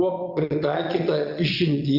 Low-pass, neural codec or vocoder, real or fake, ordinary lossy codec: 5.4 kHz; vocoder, 22.05 kHz, 80 mel bands, Vocos; fake; Opus, 64 kbps